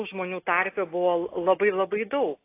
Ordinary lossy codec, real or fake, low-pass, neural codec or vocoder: AAC, 24 kbps; real; 3.6 kHz; none